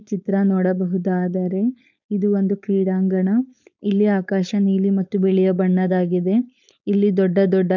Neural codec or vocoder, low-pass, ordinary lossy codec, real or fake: codec, 16 kHz, 4.8 kbps, FACodec; 7.2 kHz; none; fake